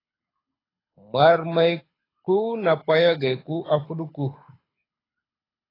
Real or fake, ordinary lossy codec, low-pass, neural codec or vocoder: fake; AAC, 24 kbps; 5.4 kHz; codec, 24 kHz, 6 kbps, HILCodec